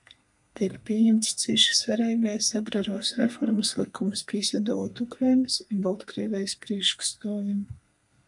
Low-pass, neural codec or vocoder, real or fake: 10.8 kHz; codec, 32 kHz, 1.9 kbps, SNAC; fake